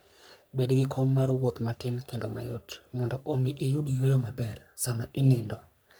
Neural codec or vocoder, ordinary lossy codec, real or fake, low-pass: codec, 44.1 kHz, 3.4 kbps, Pupu-Codec; none; fake; none